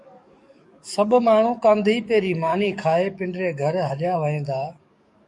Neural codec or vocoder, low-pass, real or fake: codec, 44.1 kHz, 7.8 kbps, DAC; 10.8 kHz; fake